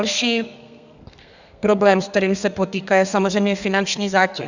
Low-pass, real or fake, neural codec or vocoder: 7.2 kHz; fake; codec, 32 kHz, 1.9 kbps, SNAC